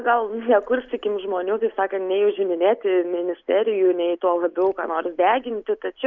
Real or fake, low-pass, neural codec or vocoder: real; 7.2 kHz; none